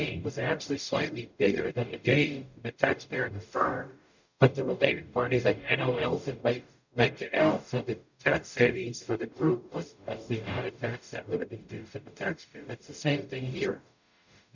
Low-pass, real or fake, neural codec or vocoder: 7.2 kHz; fake; codec, 44.1 kHz, 0.9 kbps, DAC